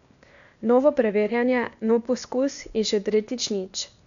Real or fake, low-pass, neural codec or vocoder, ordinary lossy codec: fake; 7.2 kHz; codec, 16 kHz, 0.8 kbps, ZipCodec; none